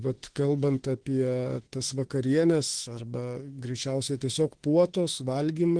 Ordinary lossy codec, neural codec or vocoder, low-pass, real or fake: Opus, 16 kbps; autoencoder, 48 kHz, 32 numbers a frame, DAC-VAE, trained on Japanese speech; 9.9 kHz; fake